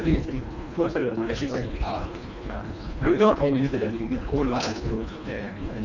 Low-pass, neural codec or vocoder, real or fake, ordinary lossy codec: 7.2 kHz; codec, 24 kHz, 1.5 kbps, HILCodec; fake; none